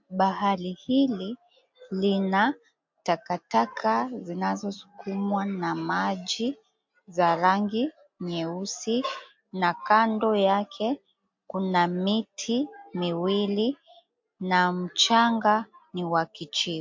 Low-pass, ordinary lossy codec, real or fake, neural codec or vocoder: 7.2 kHz; MP3, 48 kbps; real; none